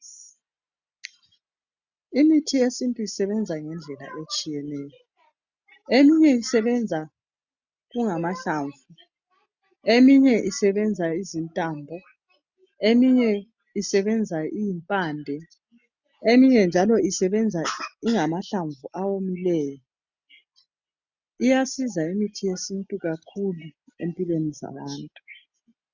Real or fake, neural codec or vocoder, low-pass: real; none; 7.2 kHz